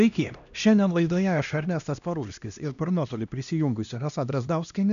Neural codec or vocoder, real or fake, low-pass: codec, 16 kHz, 1 kbps, X-Codec, HuBERT features, trained on LibriSpeech; fake; 7.2 kHz